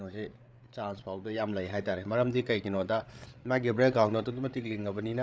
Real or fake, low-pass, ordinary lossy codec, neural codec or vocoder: fake; none; none; codec, 16 kHz, 8 kbps, FreqCodec, larger model